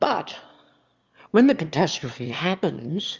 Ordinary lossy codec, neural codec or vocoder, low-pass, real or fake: Opus, 32 kbps; autoencoder, 22.05 kHz, a latent of 192 numbers a frame, VITS, trained on one speaker; 7.2 kHz; fake